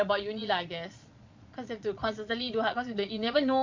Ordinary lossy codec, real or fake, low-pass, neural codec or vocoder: MP3, 64 kbps; fake; 7.2 kHz; vocoder, 22.05 kHz, 80 mel bands, Vocos